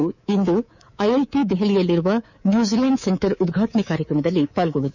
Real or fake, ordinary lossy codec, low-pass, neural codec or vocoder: fake; none; 7.2 kHz; codec, 24 kHz, 3.1 kbps, DualCodec